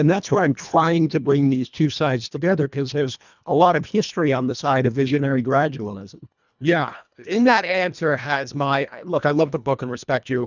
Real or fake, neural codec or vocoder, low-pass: fake; codec, 24 kHz, 1.5 kbps, HILCodec; 7.2 kHz